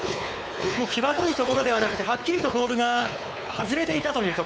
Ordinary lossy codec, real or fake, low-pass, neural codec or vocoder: none; fake; none; codec, 16 kHz, 4 kbps, X-Codec, WavLM features, trained on Multilingual LibriSpeech